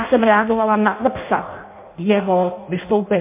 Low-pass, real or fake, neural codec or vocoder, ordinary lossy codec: 3.6 kHz; fake; codec, 16 kHz in and 24 kHz out, 0.6 kbps, FireRedTTS-2 codec; MP3, 24 kbps